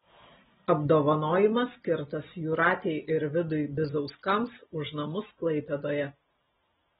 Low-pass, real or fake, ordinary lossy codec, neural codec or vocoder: 19.8 kHz; real; AAC, 16 kbps; none